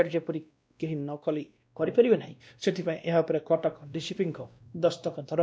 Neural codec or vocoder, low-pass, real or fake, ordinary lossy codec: codec, 16 kHz, 1 kbps, X-Codec, WavLM features, trained on Multilingual LibriSpeech; none; fake; none